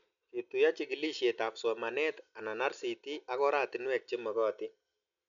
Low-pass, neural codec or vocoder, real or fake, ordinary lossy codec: 7.2 kHz; none; real; none